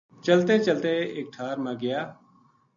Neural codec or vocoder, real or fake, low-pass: none; real; 7.2 kHz